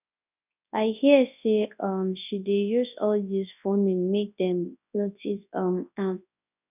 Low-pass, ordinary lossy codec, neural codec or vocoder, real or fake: 3.6 kHz; none; codec, 24 kHz, 0.9 kbps, WavTokenizer, large speech release; fake